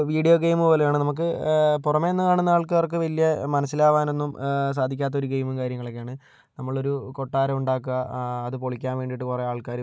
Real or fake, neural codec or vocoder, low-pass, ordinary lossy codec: real; none; none; none